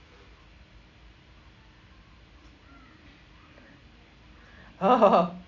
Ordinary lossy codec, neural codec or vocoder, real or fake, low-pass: Opus, 64 kbps; none; real; 7.2 kHz